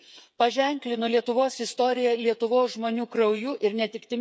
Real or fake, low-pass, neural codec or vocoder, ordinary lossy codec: fake; none; codec, 16 kHz, 8 kbps, FreqCodec, smaller model; none